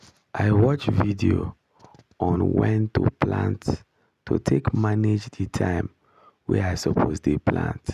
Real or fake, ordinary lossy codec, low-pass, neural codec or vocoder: real; none; 14.4 kHz; none